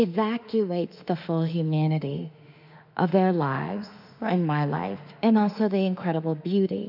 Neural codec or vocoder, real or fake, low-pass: autoencoder, 48 kHz, 32 numbers a frame, DAC-VAE, trained on Japanese speech; fake; 5.4 kHz